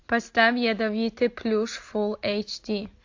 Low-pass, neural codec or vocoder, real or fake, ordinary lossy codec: 7.2 kHz; none; real; AAC, 48 kbps